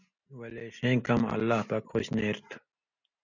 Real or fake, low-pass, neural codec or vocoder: real; 7.2 kHz; none